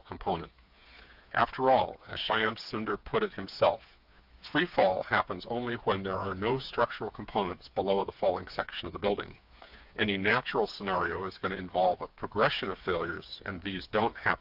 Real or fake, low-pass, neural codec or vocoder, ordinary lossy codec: fake; 5.4 kHz; codec, 16 kHz, 4 kbps, FreqCodec, smaller model; AAC, 48 kbps